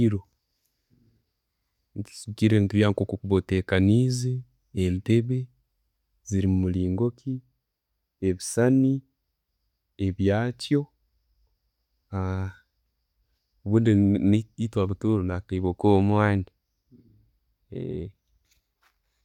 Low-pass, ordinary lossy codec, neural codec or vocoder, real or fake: 19.8 kHz; none; none; real